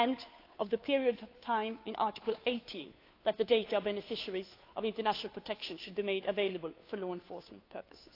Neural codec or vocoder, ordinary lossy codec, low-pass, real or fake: codec, 16 kHz, 2 kbps, FunCodec, trained on Chinese and English, 25 frames a second; AAC, 32 kbps; 5.4 kHz; fake